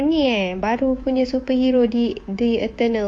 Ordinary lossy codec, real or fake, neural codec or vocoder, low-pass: AAC, 48 kbps; fake; codec, 24 kHz, 3.1 kbps, DualCodec; 9.9 kHz